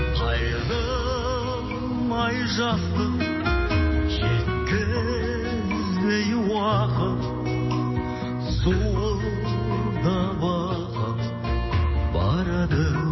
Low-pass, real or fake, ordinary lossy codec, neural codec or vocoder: 7.2 kHz; real; MP3, 24 kbps; none